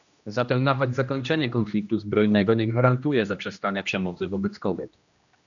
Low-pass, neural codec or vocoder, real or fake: 7.2 kHz; codec, 16 kHz, 1 kbps, X-Codec, HuBERT features, trained on general audio; fake